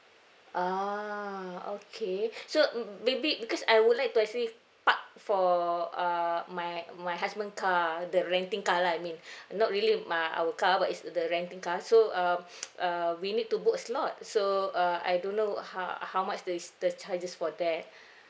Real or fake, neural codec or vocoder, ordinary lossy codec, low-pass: real; none; none; none